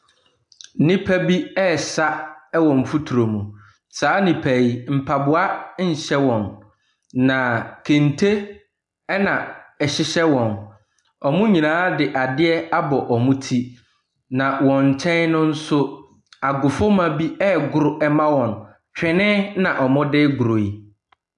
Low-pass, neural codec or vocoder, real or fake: 10.8 kHz; none; real